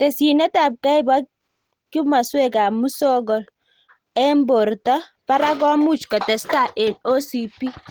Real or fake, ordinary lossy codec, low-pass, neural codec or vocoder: real; Opus, 16 kbps; 19.8 kHz; none